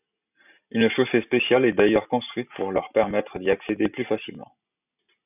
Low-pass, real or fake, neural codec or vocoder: 3.6 kHz; real; none